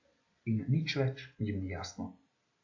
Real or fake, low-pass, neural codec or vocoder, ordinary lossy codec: real; 7.2 kHz; none; AAC, 48 kbps